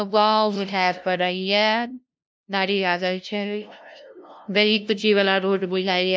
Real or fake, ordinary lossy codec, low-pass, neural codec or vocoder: fake; none; none; codec, 16 kHz, 0.5 kbps, FunCodec, trained on LibriTTS, 25 frames a second